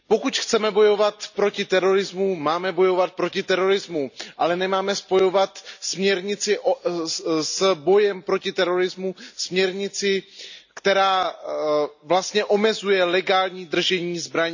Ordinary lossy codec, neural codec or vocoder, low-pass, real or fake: none; none; 7.2 kHz; real